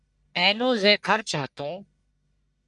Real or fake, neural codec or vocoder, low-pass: fake; codec, 44.1 kHz, 1.7 kbps, Pupu-Codec; 10.8 kHz